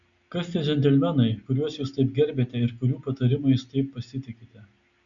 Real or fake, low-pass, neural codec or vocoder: real; 7.2 kHz; none